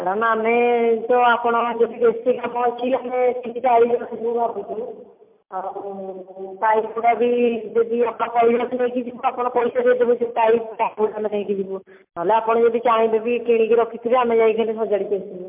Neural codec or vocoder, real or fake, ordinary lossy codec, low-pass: none; real; MP3, 32 kbps; 3.6 kHz